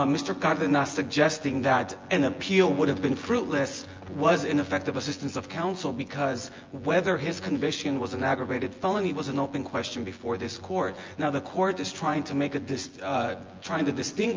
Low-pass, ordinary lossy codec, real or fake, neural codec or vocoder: 7.2 kHz; Opus, 24 kbps; fake; vocoder, 24 kHz, 100 mel bands, Vocos